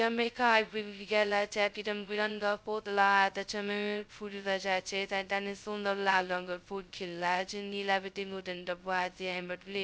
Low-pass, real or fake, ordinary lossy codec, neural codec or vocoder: none; fake; none; codec, 16 kHz, 0.2 kbps, FocalCodec